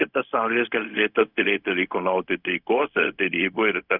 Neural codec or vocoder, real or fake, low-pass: codec, 16 kHz, 0.4 kbps, LongCat-Audio-Codec; fake; 5.4 kHz